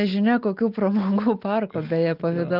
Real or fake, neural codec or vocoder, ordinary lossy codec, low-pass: real; none; Opus, 24 kbps; 5.4 kHz